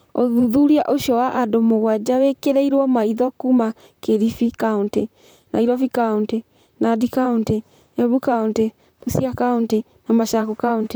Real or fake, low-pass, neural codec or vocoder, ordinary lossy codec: fake; none; vocoder, 44.1 kHz, 128 mel bands, Pupu-Vocoder; none